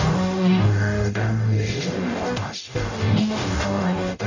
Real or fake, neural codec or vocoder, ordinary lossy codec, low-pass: fake; codec, 44.1 kHz, 0.9 kbps, DAC; none; 7.2 kHz